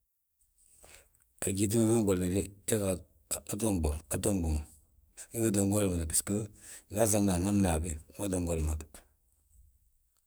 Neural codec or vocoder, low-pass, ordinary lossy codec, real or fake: codec, 44.1 kHz, 2.6 kbps, SNAC; none; none; fake